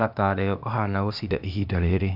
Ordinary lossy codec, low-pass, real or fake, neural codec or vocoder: none; 5.4 kHz; fake; codec, 16 kHz, 0.8 kbps, ZipCodec